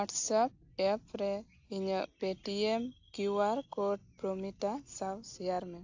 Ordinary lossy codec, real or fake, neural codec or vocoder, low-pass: AAC, 48 kbps; real; none; 7.2 kHz